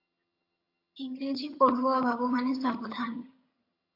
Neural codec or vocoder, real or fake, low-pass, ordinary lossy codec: vocoder, 22.05 kHz, 80 mel bands, HiFi-GAN; fake; 5.4 kHz; AAC, 32 kbps